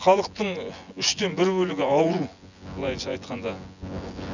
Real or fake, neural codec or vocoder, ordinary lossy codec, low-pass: fake; vocoder, 24 kHz, 100 mel bands, Vocos; none; 7.2 kHz